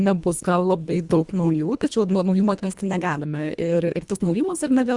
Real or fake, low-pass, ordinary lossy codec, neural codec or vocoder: fake; 10.8 kHz; Opus, 64 kbps; codec, 24 kHz, 1.5 kbps, HILCodec